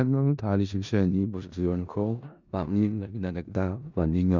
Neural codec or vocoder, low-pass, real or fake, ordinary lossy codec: codec, 16 kHz in and 24 kHz out, 0.4 kbps, LongCat-Audio-Codec, four codebook decoder; 7.2 kHz; fake; none